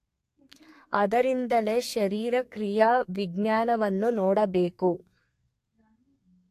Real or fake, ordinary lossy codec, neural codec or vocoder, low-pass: fake; AAC, 64 kbps; codec, 32 kHz, 1.9 kbps, SNAC; 14.4 kHz